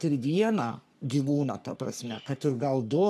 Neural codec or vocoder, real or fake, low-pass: codec, 44.1 kHz, 3.4 kbps, Pupu-Codec; fake; 14.4 kHz